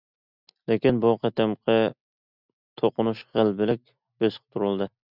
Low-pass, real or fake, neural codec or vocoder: 5.4 kHz; real; none